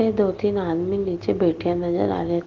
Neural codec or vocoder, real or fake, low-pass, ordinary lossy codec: none; real; 7.2 kHz; Opus, 16 kbps